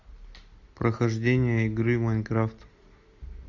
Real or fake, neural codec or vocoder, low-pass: real; none; 7.2 kHz